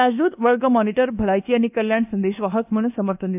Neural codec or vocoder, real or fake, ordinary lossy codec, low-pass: codec, 24 kHz, 1.2 kbps, DualCodec; fake; AAC, 32 kbps; 3.6 kHz